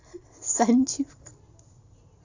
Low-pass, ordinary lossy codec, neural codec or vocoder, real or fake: 7.2 kHz; AAC, 48 kbps; none; real